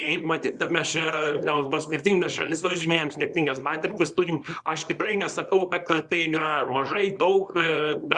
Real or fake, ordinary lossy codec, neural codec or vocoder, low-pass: fake; Opus, 64 kbps; codec, 24 kHz, 0.9 kbps, WavTokenizer, small release; 10.8 kHz